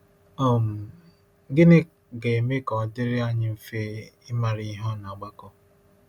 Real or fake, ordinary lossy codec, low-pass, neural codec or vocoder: real; none; 19.8 kHz; none